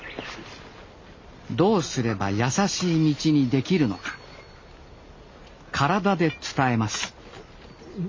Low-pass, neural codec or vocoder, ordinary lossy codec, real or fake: 7.2 kHz; none; MP3, 32 kbps; real